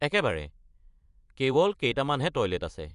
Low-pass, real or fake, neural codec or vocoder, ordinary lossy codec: 10.8 kHz; fake; vocoder, 24 kHz, 100 mel bands, Vocos; none